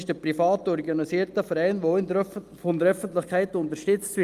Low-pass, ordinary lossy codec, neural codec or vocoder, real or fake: 14.4 kHz; Opus, 32 kbps; none; real